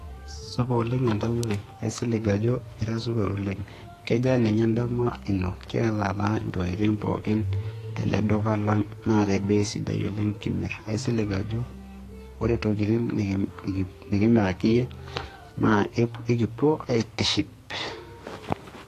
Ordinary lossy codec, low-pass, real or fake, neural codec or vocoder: AAC, 48 kbps; 14.4 kHz; fake; codec, 44.1 kHz, 2.6 kbps, SNAC